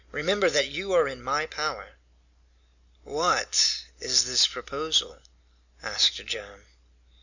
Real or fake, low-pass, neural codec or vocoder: real; 7.2 kHz; none